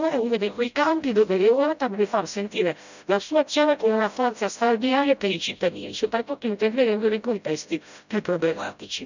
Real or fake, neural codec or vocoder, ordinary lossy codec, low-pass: fake; codec, 16 kHz, 0.5 kbps, FreqCodec, smaller model; none; 7.2 kHz